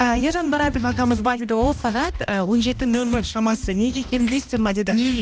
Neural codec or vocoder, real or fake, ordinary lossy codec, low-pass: codec, 16 kHz, 1 kbps, X-Codec, HuBERT features, trained on balanced general audio; fake; none; none